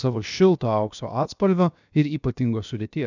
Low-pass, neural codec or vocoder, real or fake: 7.2 kHz; codec, 16 kHz, about 1 kbps, DyCAST, with the encoder's durations; fake